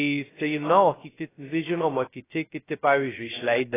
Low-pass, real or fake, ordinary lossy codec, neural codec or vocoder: 3.6 kHz; fake; AAC, 16 kbps; codec, 16 kHz, 0.2 kbps, FocalCodec